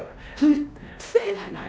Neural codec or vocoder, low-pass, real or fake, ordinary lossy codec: codec, 16 kHz, 1 kbps, X-Codec, WavLM features, trained on Multilingual LibriSpeech; none; fake; none